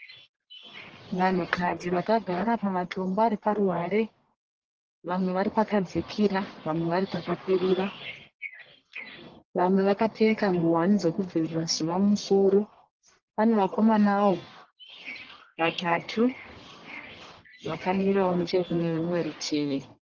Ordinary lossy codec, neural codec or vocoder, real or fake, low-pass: Opus, 16 kbps; codec, 44.1 kHz, 1.7 kbps, Pupu-Codec; fake; 7.2 kHz